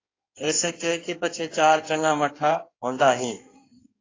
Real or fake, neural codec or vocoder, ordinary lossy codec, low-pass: fake; codec, 16 kHz in and 24 kHz out, 1.1 kbps, FireRedTTS-2 codec; AAC, 32 kbps; 7.2 kHz